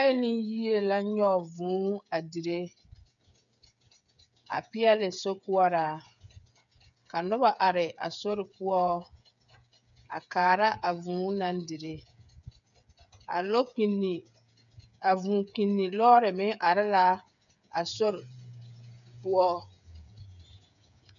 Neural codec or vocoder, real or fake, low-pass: codec, 16 kHz, 8 kbps, FreqCodec, smaller model; fake; 7.2 kHz